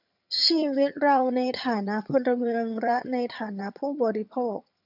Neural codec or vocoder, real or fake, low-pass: vocoder, 22.05 kHz, 80 mel bands, HiFi-GAN; fake; 5.4 kHz